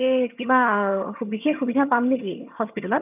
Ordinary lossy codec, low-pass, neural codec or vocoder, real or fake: none; 3.6 kHz; vocoder, 22.05 kHz, 80 mel bands, HiFi-GAN; fake